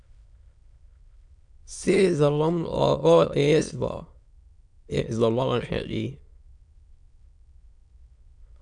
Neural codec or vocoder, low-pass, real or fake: autoencoder, 22.05 kHz, a latent of 192 numbers a frame, VITS, trained on many speakers; 9.9 kHz; fake